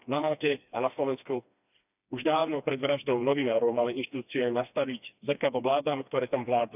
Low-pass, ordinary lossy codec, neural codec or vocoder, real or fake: 3.6 kHz; none; codec, 16 kHz, 2 kbps, FreqCodec, smaller model; fake